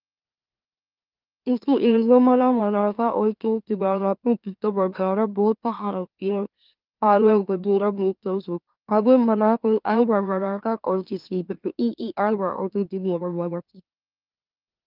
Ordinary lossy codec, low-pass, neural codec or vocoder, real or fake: Opus, 32 kbps; 5.4 kHz; autoencoder, 44.1 kHz, a latent of 192 numbers a frame, MeloTTS; fake